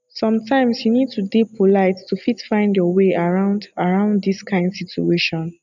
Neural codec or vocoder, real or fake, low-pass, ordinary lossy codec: none; real; 7.2 kHz; none